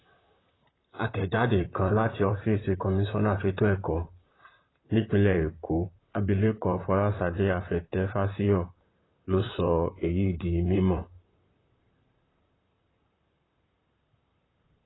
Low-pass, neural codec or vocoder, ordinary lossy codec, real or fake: 7.2 kHz; vocoder, 44.1 kHz, 128 mel bands, Pupu-Vocoder; AAC, 16 kbps; fake